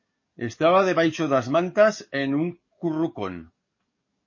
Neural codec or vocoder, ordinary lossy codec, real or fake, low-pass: codec, 44.1 kHz, 7.8 kbps, DAC; MP3, 32 kbps; fake; 7.2 kHz